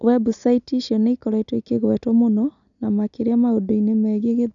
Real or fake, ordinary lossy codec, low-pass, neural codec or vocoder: real; none; 7.2 kHz; none